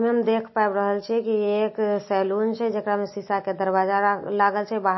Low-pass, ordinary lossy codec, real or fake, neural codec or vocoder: 7.2 kHz; MP3, 24 kbps; real; none